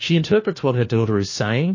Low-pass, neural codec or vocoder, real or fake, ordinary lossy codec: 7.2 kHz; codec, 16 kHz, 1 kbps, FunCodec, trained on LibriTTS, 50 frames a second; fake; MP3, 32 kbps